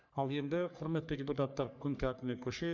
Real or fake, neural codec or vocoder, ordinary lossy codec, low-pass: fake; codec, 44.1 kHz, 3.4 kbps, Pupu-Codec; none; 7.2 kHz